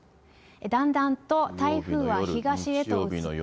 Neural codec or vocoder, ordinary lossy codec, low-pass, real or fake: none; none; none; real